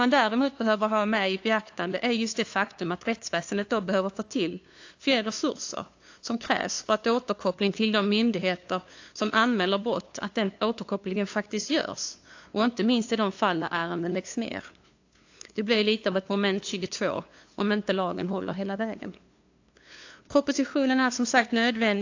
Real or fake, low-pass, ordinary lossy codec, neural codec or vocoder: fake; 7.2 kHz; AAC, 48 kbps; codec, 16 kHz, 2 kbps, FunCodec, trained on LibriTTS, 25 frames a second